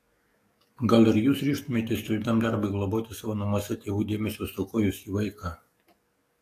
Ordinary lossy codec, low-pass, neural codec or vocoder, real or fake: AAC, 48 kbps; 14.4 kHz; codec, 44.1 kHz, 7.8 kbps, DAC; fake